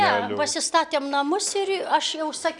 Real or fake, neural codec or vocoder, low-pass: real; none; 10.8 kHz